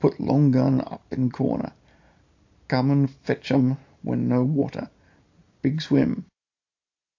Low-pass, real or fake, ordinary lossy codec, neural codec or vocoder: 7.2 kHz; real; AAC, 48 kbps; none